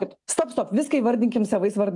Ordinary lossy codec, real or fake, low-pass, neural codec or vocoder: AAC, 64 kbps; real; 10.8 kHz; none